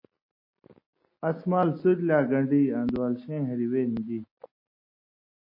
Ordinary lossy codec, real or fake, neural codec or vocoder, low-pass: MP3, 24 kbps; real; none; 5.4 kHz